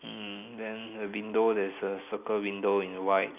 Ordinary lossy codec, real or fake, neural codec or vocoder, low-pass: none; real; none; 3.6 kHz